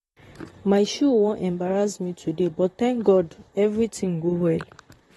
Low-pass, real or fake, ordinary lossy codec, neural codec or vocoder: 19.8 kHz; fake; AAC, 32 kbps; vocoder, 44.1 kHz, 128 mel bands, Pupu-Vocoder